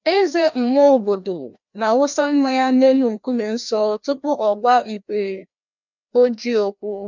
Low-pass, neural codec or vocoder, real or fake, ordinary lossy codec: 7.2 kHz; codec, 16 kHz, 1 kbps, FreqCodec, larger model; fake; none